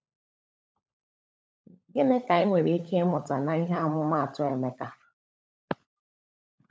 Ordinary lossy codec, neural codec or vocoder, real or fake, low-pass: none; codec, 16 kHz, 16 kbps, FunCodec, trained on LibriTTS, 50 frames a second; fake; none